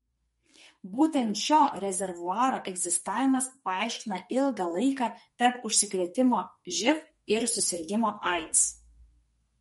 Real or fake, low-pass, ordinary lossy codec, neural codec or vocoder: fake; 14.4 kHz; MP3, 48 kbps; codec, 32 kHz, 1.9 kbps, SNAC